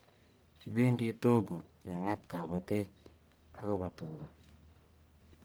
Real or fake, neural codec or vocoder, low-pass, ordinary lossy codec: fake; codec, 44.1 kHz, 1.7 kbps, Pupu-Codec; none; none